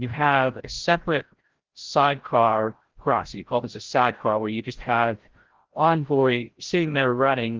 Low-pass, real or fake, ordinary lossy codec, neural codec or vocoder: 7.2 kHz; fake; Opus, 16 kbps; codec, 16 kHz, 0.5 kbps, FreqCodec, larger model